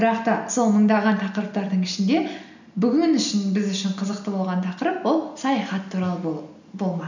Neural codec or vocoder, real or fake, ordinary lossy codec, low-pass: none; real; none; 7.2 kHz